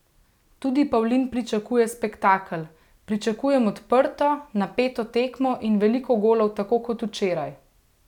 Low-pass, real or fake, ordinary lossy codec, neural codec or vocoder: 19.8 kHz; real; none; none